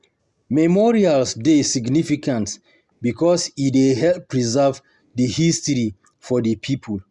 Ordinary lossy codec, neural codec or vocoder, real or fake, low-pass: Opus, 64 kbps; none; real; 10.8 kHz